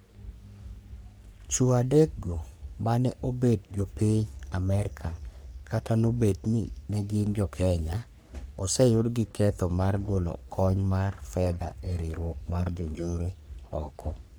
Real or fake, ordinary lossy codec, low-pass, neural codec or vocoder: fake; none; none; codec, 44.1 kHz, 3.4 kbps, Pupu-Codec